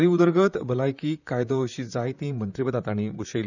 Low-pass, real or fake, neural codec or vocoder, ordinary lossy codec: 7.2 kHz; fake; vocoder, 44.1 kHz, 128 mel bands, Pupu-Vocoder; none